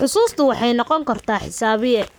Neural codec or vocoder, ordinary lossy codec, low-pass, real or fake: codec, 44.1 kHz, 7.8 kbps, Pupu-Codec; none; none; fake